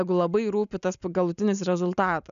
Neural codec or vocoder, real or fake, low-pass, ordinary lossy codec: none; real; 7.2 kHz; MP3, 96 kbps